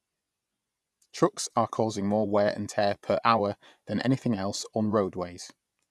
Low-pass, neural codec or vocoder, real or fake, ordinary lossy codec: none; vocoder, 24 kHz, 100 mel bands, Vocos; fake; none